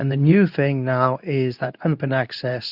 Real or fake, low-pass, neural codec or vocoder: fake; 5.4 kHz; codec, 24 kHz, 0.9 kbps, WavTokenizer, medium speech release version 2